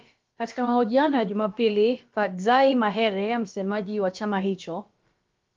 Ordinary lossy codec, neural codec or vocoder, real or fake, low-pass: Opus, 32 kbps; codec, 16 kHz, about 1 kbps, DyCAST, with the encoder's durations; fake; 7.2 kHz